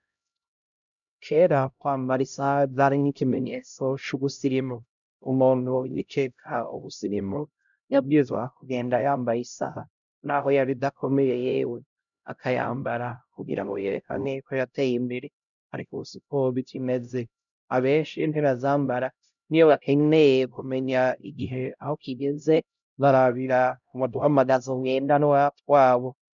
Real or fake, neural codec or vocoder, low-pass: fake; codec, 16 kHz, 0.5 kbps, X-Codec, HuBERT features, trained on LibriSpeech; 7.2 kHz